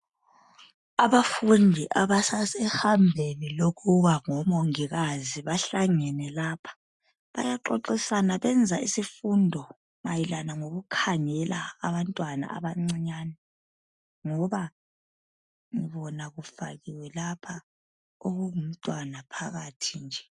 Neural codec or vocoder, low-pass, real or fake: none; 10.8 kHz; real